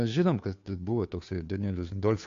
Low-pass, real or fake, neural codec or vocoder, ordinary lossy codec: 7.2 kHz; fake; codec, 16 kHz, 2 kbps, FunCodec, trained on Chinese and English, 25 frames a second; AAC, 48 kbps